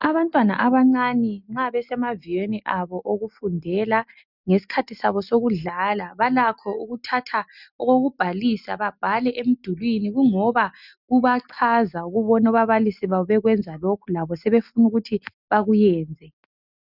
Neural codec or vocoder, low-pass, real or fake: none; 5.4 kHz; real